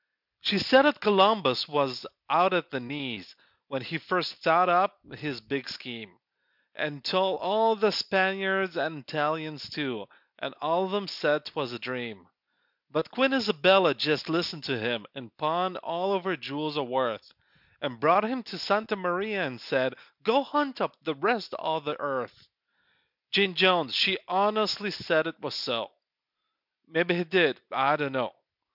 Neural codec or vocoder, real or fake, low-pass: none; real; 5.4 kHz